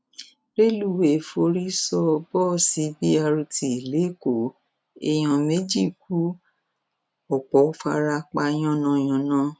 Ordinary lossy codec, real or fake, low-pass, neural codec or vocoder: none; real; none; none